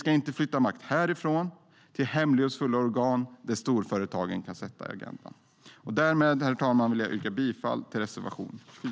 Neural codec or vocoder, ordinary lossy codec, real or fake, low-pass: none; none; real; none